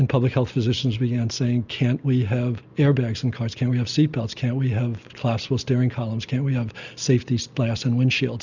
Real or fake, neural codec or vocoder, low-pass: real; none; 7.2 kHz